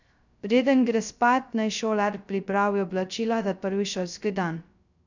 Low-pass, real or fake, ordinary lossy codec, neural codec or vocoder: 7.2 kHz; fake; none; codec, 16 kHz, 0.2 kbps, FocalCodec